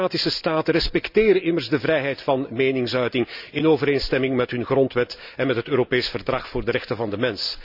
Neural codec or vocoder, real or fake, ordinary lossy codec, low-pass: none; real; none; 5.4 kHz